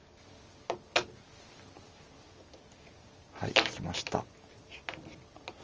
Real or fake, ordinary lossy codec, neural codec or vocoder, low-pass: real; Opus, 24 kbps; none; 7.2 kHz